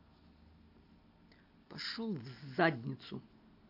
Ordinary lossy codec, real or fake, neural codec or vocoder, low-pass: AAC, 32 kbps; real; none; 5.4 kHz